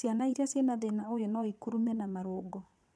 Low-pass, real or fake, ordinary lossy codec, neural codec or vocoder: none; fake; none; vocoder, 22.05 kHz, 80 mel bands, WaveNeXt